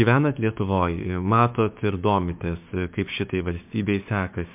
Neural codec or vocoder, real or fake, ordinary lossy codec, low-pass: codec, 16 kHz, 6 kbps, DAC; fake; MP3, 32 kbps; 3.6 kHz